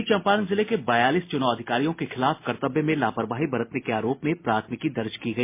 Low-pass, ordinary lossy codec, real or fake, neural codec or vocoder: 3.6 kHz; MP3, 24 kbps; real; none